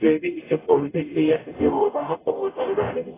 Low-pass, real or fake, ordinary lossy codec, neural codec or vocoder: 3.6 kHz; fake; AAC, 16 kbps; codec, 44.1 kHz, 0.9 kbps, DAC